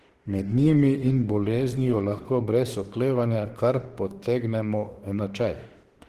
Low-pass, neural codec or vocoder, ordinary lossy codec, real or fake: 14.4 kHz; autoencoder, 48 kHz, 32 numbers a frame, DAC-VAE, trained on Japanese speech; Opus, 16 kbps; fake